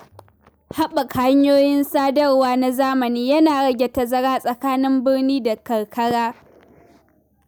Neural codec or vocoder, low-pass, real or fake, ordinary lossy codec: none; none; real; none